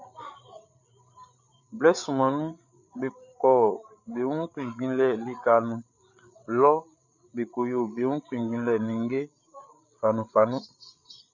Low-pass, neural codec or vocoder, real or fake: 7.2 kHz; codec, 16 kHz, 8 kbps, FreqCodec, larger model; fake